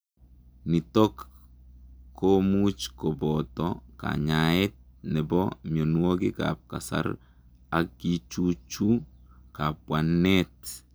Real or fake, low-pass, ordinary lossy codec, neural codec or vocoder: real; none; none; none